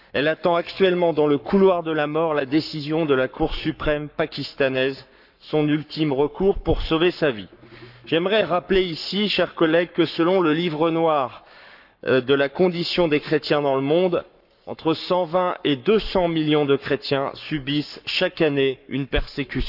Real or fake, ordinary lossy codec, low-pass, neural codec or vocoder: fake; none; 5.4 kHz; codec, 44.1 kHz, 7.8 kbps, Pupu-Codec